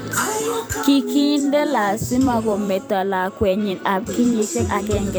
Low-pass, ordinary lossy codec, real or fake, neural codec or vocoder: none; none; fake; vocoder, 44.1 kHz, 128 mel bands every 512 samples, BigVGAN v2